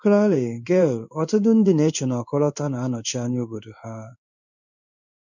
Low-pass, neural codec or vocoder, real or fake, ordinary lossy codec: 7.2 kHz; codec, 16 kHz in and 24 kHz out, 1 kbps, XY-Tokenizer; fake; none